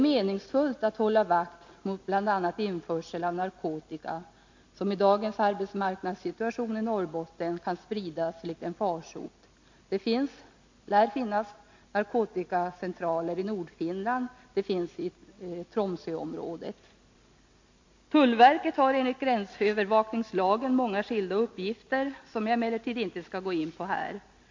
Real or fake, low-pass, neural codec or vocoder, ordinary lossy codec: real; 7.2 kHz; none; MP3, 48 kbps